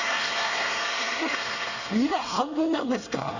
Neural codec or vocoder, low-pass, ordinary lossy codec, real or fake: codec, 24 kHz, 1 kbps, SNAC; 7.2 kHz; none; fake